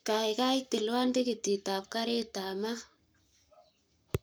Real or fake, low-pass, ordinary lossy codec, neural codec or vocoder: fake; none; none; codec, 44.1 kHz, 7.8 kbps, Pupu-Codec